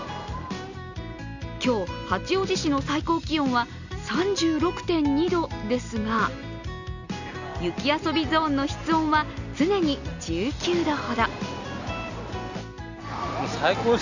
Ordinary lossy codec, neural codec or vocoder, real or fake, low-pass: none; none; real; 7.2 kHz